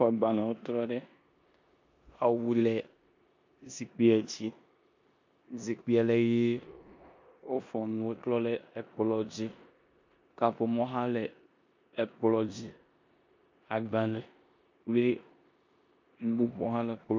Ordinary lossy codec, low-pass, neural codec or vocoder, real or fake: MP3, 48 kbps; 7.2 kHz; codec, 16 kHz in and 24 kHz out, 0.9 kbps, LongCat-Audio-Codec, four codebook decoder; fake